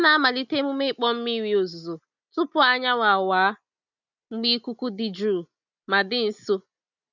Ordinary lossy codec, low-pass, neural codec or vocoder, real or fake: none; 7.2 kHz; none; real